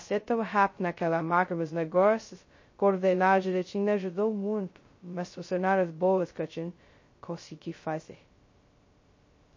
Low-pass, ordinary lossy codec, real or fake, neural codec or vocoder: 7.2 kHz; MP3, 32 kbps; fake; codec, 16 kHz, 0.2 kbps, FocalCodec